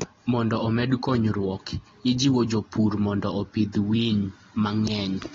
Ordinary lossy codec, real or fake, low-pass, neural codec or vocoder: AAC, 24 kbps; real; 7.2 kHz; none